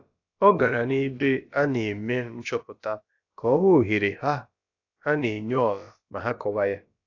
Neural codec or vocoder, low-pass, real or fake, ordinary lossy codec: codec, 16 kHz, about 1 kbps, DyCAST, with the encoder's durations; 7.2 kHz; fake; AAC, 48 kbps